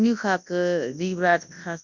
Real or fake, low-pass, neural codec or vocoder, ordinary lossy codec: fake; 7.2 kHz; codec, 24 kHz, 0.9 kbps, WavTokenizer, large speech release; none